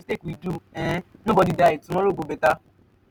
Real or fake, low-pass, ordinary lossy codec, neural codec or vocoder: real; none; none; none